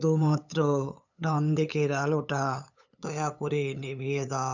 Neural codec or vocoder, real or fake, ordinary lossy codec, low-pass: codec, 16 kHz, 8 kbps, FunCodec, trained on LibriTTS, 25 frames a second; fake; none; 7.2 kHz